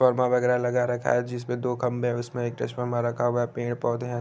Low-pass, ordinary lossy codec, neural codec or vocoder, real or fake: none; none; none; real